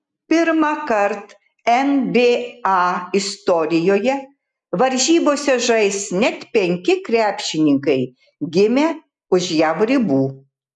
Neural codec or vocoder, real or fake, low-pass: none; real; 10.8 kHz